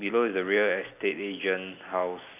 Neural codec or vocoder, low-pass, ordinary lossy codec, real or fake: none; 3.6 kHz; none; real